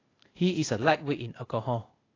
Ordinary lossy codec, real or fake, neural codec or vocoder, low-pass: AAC, 32 kbps; fake; codec, 16 kHz, 0.8 kbps, ZipCodec; 7.2 kHz